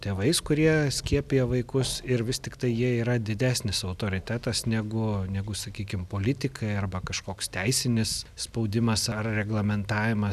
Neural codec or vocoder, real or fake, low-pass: none; real; 14.4 kHz